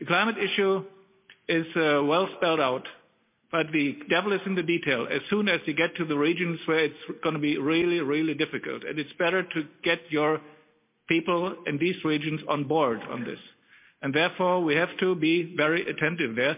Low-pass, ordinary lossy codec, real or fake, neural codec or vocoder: 3.6 kHz; MP3, 32 kbps; real; none